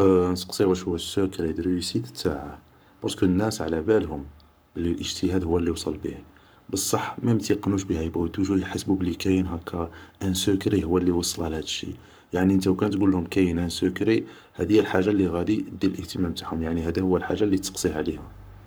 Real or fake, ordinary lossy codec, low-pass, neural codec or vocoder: fake; none; none; codec, 44.1 kHz, 7.8 kbps, Pupu-Codec